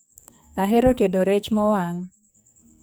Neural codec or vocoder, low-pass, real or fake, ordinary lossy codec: codec, 44.1 kHz, 2.6 kbps, SNAC; none; fake; none